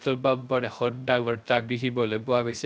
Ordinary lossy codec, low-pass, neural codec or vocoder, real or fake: none; none; codec, 16 kHz, 0.3 kbps, FocalCodec; fake